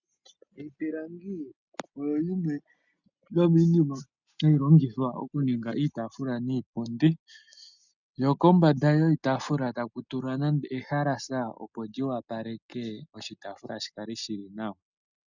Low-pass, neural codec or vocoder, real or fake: 7.2 kHz; none; real